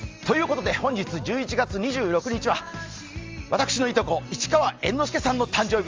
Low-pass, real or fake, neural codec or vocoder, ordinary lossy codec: 7.2 kHz; real; none; Opus, 32 kbps